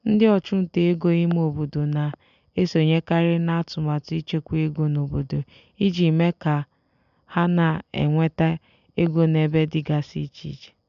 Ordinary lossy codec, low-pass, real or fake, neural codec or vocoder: MP3, 96 kbps; 7.2 kHz; real; none